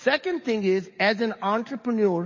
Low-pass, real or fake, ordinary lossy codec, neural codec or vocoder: 7.2 kHz; fake; MP3, 32 kbps; codec, 44.1 kHz, 7.8 kbps, DAC